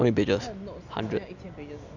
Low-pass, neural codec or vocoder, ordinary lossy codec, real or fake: 7.2 kHz; none; none; real